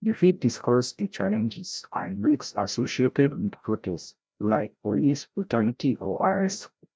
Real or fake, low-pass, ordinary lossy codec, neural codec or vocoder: fake; none; none; codec, 16 kHz, 0.5 kbps, FreqCodec, larger model